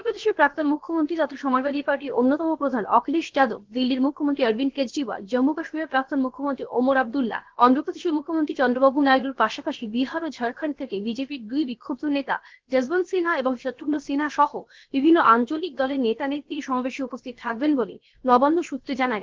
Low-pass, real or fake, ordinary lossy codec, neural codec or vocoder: 7.2 kHz; fake; Opus, 16 kbps; codec, 16 kHz, about 1 kbps, DyCAST, with the encoder's durations